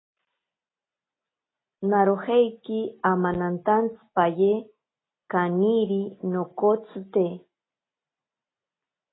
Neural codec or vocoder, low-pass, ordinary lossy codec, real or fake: none; 7.2 kHz; AAC, 16 kbps; real